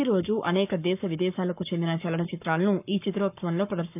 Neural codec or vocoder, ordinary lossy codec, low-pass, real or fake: codec, 44.1 kHz, 7.8 kbps, Pupu-Codec; none; 3.6 kHz; fake